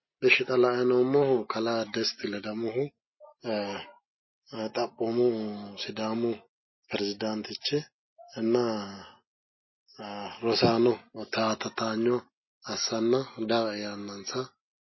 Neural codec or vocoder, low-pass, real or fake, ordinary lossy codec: none; 7.2 kHz; real; MP3, 24 kbps